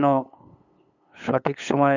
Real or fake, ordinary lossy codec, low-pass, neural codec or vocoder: fake; none; 7.2 kHz; codec, 16 kHz, 8 kbps, FunCodec, trained on LibriTTS, 25 frames a second